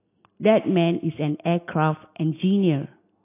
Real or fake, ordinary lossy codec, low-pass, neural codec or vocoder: real; AAC, 24 kbps; 3.6 kHz; none